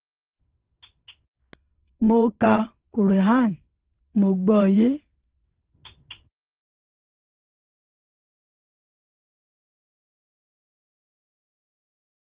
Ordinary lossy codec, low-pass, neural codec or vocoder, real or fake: Opus, 16 kbps; 3.6 kHz; vocoder, 22.05 kHz, 80 mel bands, WaveNeXt; fake